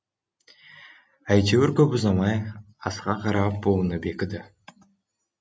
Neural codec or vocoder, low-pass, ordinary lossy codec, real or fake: none; none; none; real